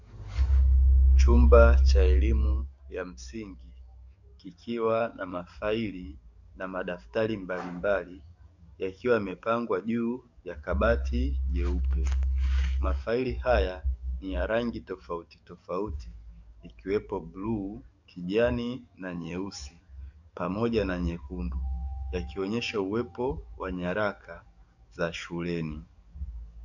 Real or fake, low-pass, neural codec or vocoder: fake; 7.2 kHz; codec, 44.1 kHz, 7.8 kbps, DAC